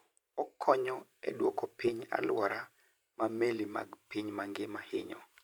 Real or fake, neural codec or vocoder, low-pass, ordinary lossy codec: fake; vocoder, 44.1 kHz, 128 mel bands every 512 samples, BigVGAN v2; none; none